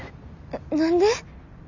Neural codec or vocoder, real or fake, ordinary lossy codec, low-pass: none; real; none; 7.2 kHz